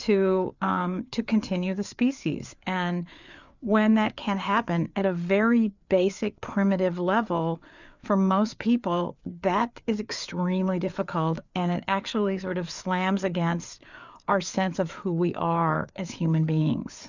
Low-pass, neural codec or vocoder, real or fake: 7.2 kHz; codec, 16 kHz, 4 kbps, FreqCodec, larger model; fake